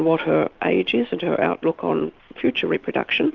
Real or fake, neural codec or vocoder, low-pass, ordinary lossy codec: real; none; 7.2 kHz; Opus, 24 kbps